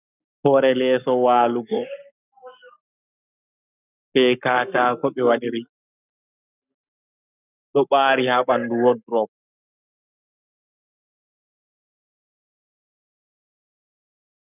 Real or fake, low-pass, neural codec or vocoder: fake; 3.6 kHz; codec, 44.1 kHz, 7.8 kbps, Pupu-Codec